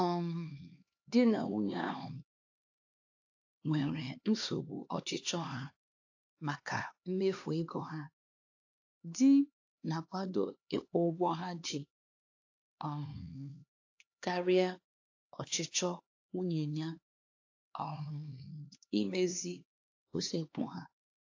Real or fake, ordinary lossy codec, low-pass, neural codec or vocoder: fake; AAC, 48 kbps; 7.2 kHz; codec, 16 kHz, 2 kbps, X-Codec, HuBERT features, trained on LibriSpeech